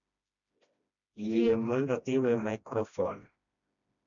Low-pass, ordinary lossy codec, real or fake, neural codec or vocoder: 7.2 kHz; none; fake; codec, 16 kHz, 1 kbps, FreqCodec, smaller model